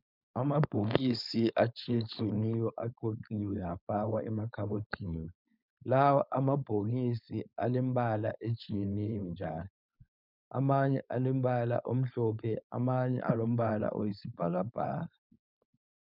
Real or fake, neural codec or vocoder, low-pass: fake; codec, 16 kHz, 4.8 kbps, FACodec; 5.4 kHz